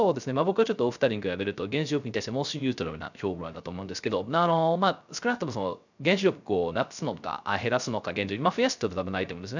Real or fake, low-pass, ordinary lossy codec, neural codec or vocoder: fake; 7.2 kHz; none; codec, 16 kHz, 0.3 kbps, FocalCodec